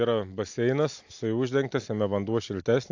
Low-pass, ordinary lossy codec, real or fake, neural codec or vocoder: 7.2 kHz; AAC, 48 kbps; real; none